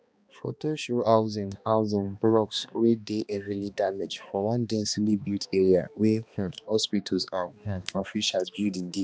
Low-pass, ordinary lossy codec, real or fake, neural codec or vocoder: none; none; fake; codec, 16 kHz, 2 kbps, X-Codec, HuBERT features, trained on balanced general audio